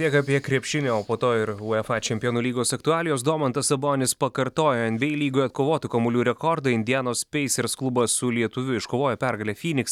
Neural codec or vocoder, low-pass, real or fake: none; 19.8 kHz; real